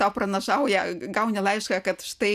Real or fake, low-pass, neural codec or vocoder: real; 14.4 kHz; none